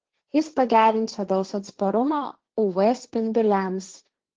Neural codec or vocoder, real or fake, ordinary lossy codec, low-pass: codec, 16 kHz, 1.1 kbps, Voila-Tokenizer; fake; Opus, 16 kbps; 7.2 kHz